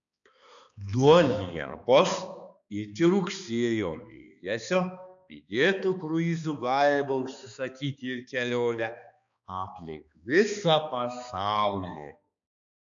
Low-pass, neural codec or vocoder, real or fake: 7.2 kHz; codec, 16 kHz, 2 kbps, X-Codec, HuBERT features, trained on balanced general audio; fake